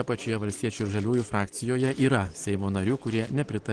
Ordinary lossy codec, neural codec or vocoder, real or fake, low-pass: Opus, 16 kbps; none; real; 9.9 kHz